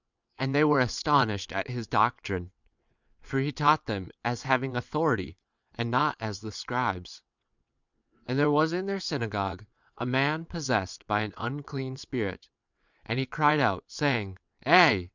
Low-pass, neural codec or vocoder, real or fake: 7.2 kHz; vocoder, 22.05 kHz, 80 mel bands, WaveNeXt; fake